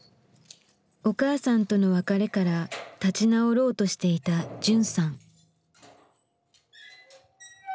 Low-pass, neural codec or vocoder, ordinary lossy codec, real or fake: none; none; none; real